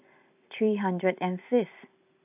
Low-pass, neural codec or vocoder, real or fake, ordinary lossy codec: 3.6 kHz; vocoder, 44.1 kHz, 128 mel bands every 256 samples, BigVGAN v2; fake; AAC, 32 kbps